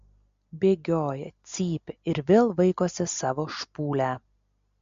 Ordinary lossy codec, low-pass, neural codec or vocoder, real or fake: MP3, 48 kbps; 7.2 kHz; none; real